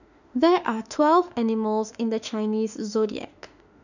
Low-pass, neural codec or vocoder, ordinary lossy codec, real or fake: 7.2 kHz; autoencoder, 48 kHz, 32 numbers a frame, DAC-VAE, trained on Japanese speech; none; fake